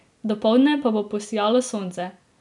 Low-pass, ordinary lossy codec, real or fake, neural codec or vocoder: 10.8 kHz; none; real; none